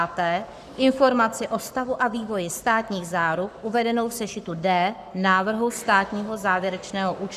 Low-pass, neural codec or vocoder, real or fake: 14.4 kHz; codec, 44.1 kHz, 7.8 kbps, Pupu-Codec; fake